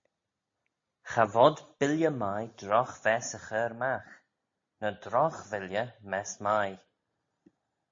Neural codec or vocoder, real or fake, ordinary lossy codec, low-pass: none; real; MP3, 32 kbps; 7.2 kHz